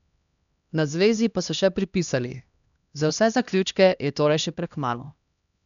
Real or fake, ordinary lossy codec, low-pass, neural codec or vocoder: fake; none; 7.2 kHz; codec, 16 kHz, 1 kbps, X-Codec, HuBERT features, trained on LibriSpeech